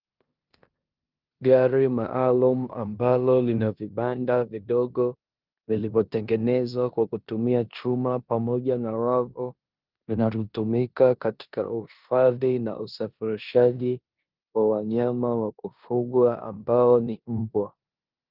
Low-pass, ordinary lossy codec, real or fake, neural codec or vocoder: 5.4 kHz; Opus, 24 kbps; fake; codec, 16 kHz in and 24 kHz out, 0.9 kbps, LongCat-Audio-Codec, four codebook decoder